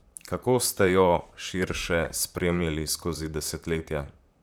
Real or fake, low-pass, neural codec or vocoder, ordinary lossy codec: fake; none; vocoder, 44.1 kHz, 128 mel bands, Pupu-Vocoder; none